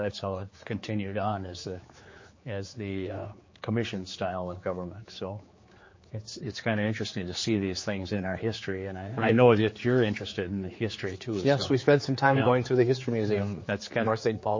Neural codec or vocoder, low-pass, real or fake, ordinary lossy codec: codec, 16 kHz, 4 kbps, X-Codec, HuBERT features, trained on general audio; 7.2 kHz; fake; MP3, 32 kbps